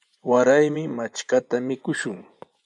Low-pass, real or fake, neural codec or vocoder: 10.8 kHz; fake; vocoder, 24 kHz, 100 mel bands, Vocos